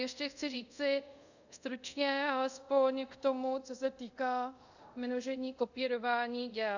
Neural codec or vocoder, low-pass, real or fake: codec, 24 kHz, 0.5 kbps, DualCodec; 7.2 kHz; fake